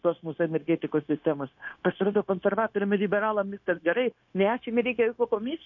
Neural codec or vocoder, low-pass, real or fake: codec, 16 kHz, 0.9 kbps, LongCat-Audio-Codec; 7.2 kHz; fake